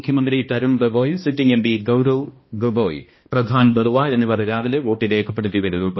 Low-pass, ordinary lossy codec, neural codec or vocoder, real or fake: 7.2 kHz; MP3, 24 kbps; codec, 16 kHz, 1 kbps, X-Codec, HuBERT features, trained on balanced general audio; fake